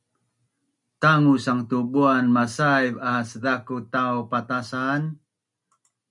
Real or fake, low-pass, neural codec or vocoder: real; 10.8 kHz; none